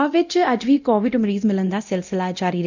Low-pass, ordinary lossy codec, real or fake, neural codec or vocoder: 7.2 kHz; none; fake; codec, 24 kHz, 0.9 kbps, DualCodec